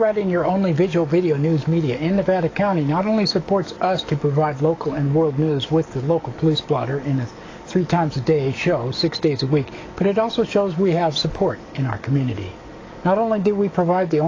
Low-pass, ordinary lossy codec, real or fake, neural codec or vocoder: 7.2 kHz; AAC, 32 kbps; fake; codec, 44.1 kHz, 7.8 kbps, DAC